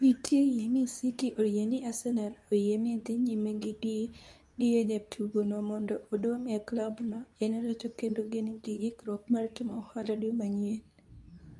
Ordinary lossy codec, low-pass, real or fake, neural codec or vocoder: none; none; fake; codec, 24 kHz, 0.9 kbps, WavTokenizer, medium speech release version 2